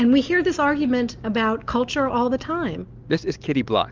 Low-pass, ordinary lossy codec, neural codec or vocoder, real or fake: 7.2 kHz; Opus, 32 kbps; none; real